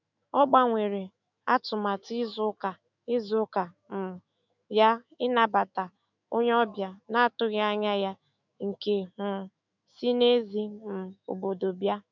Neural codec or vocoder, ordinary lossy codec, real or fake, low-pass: autoencoder, 48 kHz, 128 numbers a frame, DAC-VAE, trained on Japanese speech; none; fake; 7.2 kHz